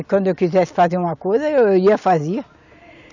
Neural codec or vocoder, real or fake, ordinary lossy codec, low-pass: none; real; none; 7.2 kHz